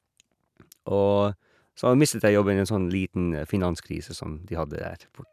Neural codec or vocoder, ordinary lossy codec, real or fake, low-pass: none; none; real; 14.4 kHz